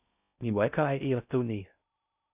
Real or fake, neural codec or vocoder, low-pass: fake; codec, 16 kHz in and 24 kHz out, 0.6 kbps, FocalCodec, streaming, 4096 codes; 3.6 kHz